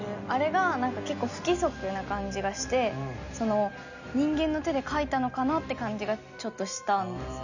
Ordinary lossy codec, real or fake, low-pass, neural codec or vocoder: none; real; 7.2 kHz; none